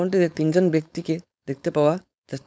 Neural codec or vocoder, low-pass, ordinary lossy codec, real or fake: codec, 16 kHz, 4.8 kbps, FACodec; none; none; fake